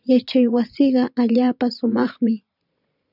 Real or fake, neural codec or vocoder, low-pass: fake; vocoder, 22.05 kHz, 80 mel bands, Vocos; 5.4 kHz